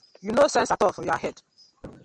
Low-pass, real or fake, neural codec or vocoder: 9.9 kHz; real; none